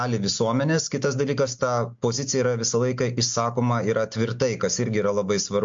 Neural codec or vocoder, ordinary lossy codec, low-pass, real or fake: none; MP3, 48 kbps; 7.2 kHz; real